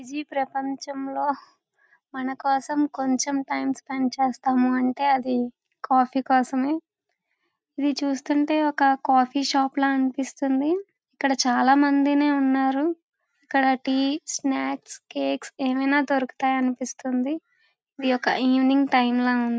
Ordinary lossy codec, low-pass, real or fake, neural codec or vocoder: none; none; real; none